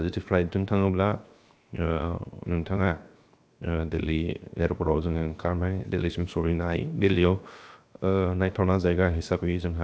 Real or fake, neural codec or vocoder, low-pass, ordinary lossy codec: fake; codec, 16 kHz, 0.7 kbps, FocalCodec; none; none